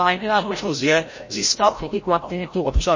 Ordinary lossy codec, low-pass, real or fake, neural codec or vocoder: MP3, 32 kbps; 7.2 kHz; fake; codec, 16 kHz, 0.5 kbps, FreqCodec, larger model